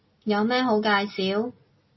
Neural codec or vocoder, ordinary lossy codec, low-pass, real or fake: none; MP3, 24 kbps; 7.2 kHz; real